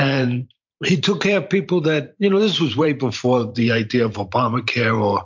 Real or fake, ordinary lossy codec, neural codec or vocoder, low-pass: fake; MP3, 48 kbps; vocoder, 44.1 kHz, 128 mel bands every 512 samples, BigVGAN v2; 7.2 kHz